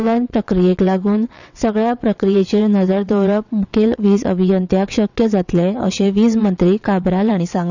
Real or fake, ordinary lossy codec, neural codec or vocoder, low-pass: fake; none; vocoder, 22.05 kHz, 80 mel bands, WaveNeXt; 7.2 kHz